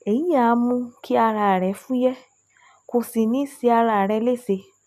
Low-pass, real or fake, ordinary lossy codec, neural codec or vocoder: 14.4 kHz; real; none; none